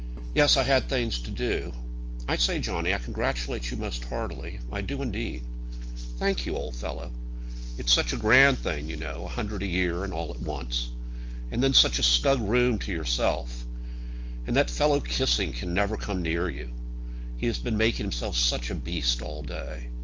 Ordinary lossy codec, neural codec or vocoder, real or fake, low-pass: Opus, 24 kbps; none; real; 7.2 kHz